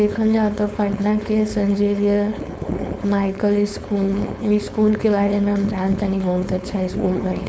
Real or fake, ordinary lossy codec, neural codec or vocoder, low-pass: fake; none; codec, 16 kHz, 4.8 kbps, FACodec; none